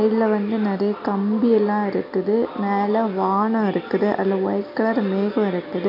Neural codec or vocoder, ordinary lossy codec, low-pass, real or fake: none; none; 5.4 kHz; real